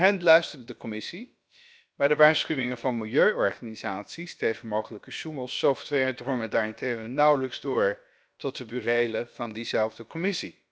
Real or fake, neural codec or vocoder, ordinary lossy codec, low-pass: fake; codec, 16 kHz, about 1 kbps, DyCAST, with the encoder's durations; none; none